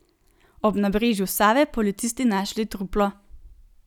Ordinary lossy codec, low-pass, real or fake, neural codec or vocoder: none; 19.8 kHz; real; none